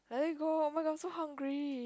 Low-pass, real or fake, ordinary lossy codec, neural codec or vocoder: none; real; none; none